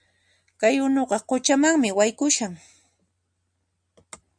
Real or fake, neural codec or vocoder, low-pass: real; none; 9.9 kHz